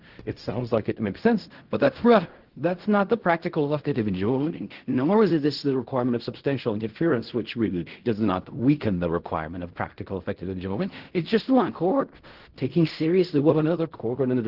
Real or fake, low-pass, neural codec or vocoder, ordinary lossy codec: fake; 5.4 kHz; codec, 16 kHz in and 24 kHz out, 0.4 kbps, LongCat-Audio-Codec, fine tuned four codebook decoder; Opus, 16 kbps